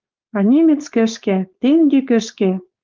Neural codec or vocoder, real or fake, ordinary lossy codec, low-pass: codec, 16 kHz, 4.8 kbps, FACodec; fake; Opus, 32 kbps; 7.2 kHz